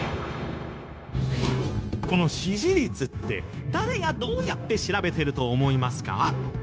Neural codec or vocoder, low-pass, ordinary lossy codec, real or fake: codec, 16 kHz, 0.9 kbps, LongCat-Audio-Codec; none; none; fake